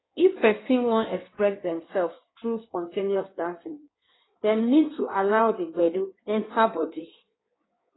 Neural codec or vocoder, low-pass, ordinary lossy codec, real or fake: codec, 16 kHz in and 24 kHz out, 1.1 kbps, FireRedTTS-2 codec; 7.2 kHz; AAC, 16 kbps; fake